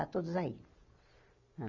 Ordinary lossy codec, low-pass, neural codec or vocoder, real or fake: none; 7.2 kHz; none; real